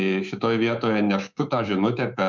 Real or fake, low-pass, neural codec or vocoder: fake; 7.2 kHz; codec, 24 kHz, 3.1 kbps, DualCodec